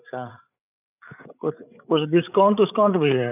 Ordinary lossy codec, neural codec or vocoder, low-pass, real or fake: none; codec, 16 kHz, 8 kbps, FreqCodec, larger model; 3.6 kHz; fake